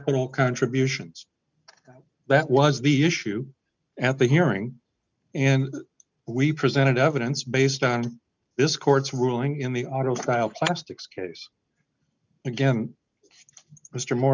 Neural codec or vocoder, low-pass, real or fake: none; 7.2 kHz; real